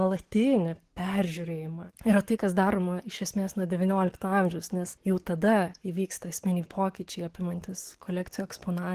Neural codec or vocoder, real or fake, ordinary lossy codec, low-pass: codec, 44.1 kHz, 7.8 kbps, DAC; fake; Opus, 16 kbps; 14.4 kHz